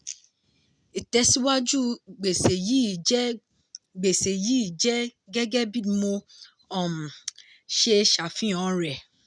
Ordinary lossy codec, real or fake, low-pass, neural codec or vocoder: none; real; 9.9 kHz; none